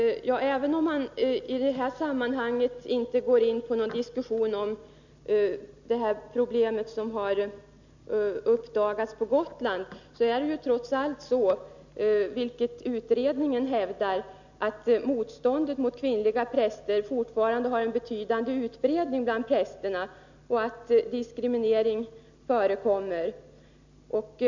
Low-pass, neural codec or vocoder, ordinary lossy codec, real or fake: 7.2 kHz; none; none; real